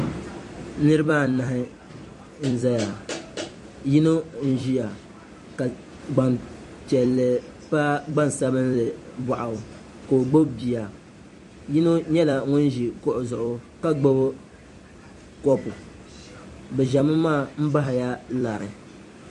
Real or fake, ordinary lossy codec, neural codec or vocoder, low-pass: fake; MP3, 48 kbps; vocoder, 44.1 kHz, 128 mel bands every 256 samples, BigVGAN v2; 14.4 kHz